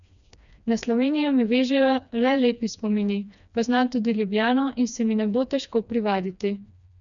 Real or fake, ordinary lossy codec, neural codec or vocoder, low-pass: fake; none; codec, 16 kHz, 2 kbps, FreqCodec, smaller model; 7.2 kHz